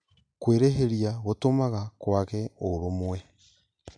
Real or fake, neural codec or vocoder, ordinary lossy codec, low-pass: real; none; MP3, 96 kbps; 9.9 kHz